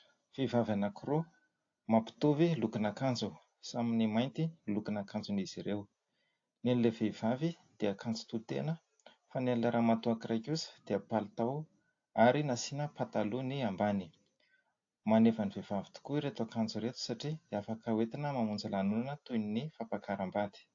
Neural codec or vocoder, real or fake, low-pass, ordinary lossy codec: none; real; 7.2 kHz; AAC, 48 kbps